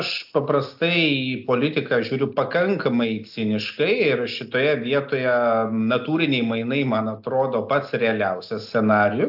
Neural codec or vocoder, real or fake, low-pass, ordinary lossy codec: none; real; 5.4 kHz; AAC, 48 kbps